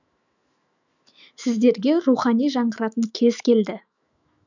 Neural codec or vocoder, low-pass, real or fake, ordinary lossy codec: autoencoder, 48 kHz, 128 numbers a frame, DAC-VAE, trained on Japanese speech; 7.2 kHz; fake; none